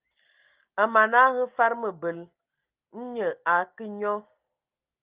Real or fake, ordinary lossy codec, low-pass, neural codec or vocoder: real; Opus, 24 kbps; 3.6 kHz; none